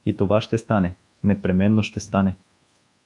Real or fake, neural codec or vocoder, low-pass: fake; codec, 24 kHz, 1.2 kbps, DualCodec; 10.8 kHz